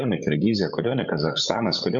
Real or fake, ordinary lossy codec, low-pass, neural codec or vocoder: fake; AAC, 64 kbps; 7.2 kHz; codec, 16 kHz, 16 kbps, FreqCodec, larger model